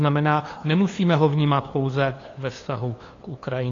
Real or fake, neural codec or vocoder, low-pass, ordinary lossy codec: fake; codec, 16 kHz, 2 kbps, FunCodec, trained on LibriTTS, 25 frames a second; 7.2 kHz; AAC, 32 kbps